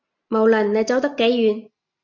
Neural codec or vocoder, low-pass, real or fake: none; 7.2 kHz; real